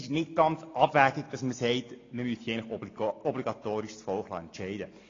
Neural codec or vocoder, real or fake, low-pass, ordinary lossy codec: none; real; 7.2 kHz; AAC, 32 kbps